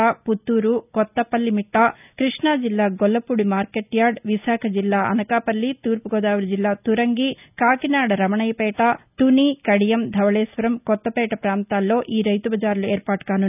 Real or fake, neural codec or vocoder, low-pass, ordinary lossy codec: real; none; 3.6 kHz; none